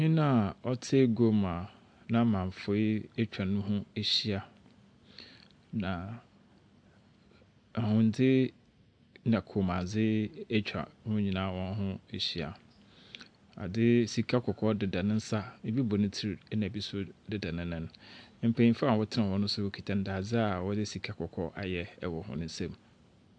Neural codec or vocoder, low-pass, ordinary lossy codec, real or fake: none; 9.9 kHz; AAC, 64 kbps; real